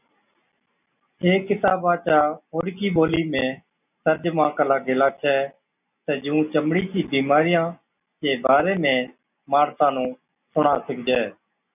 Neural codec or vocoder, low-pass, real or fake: none; 3.6 kHz; real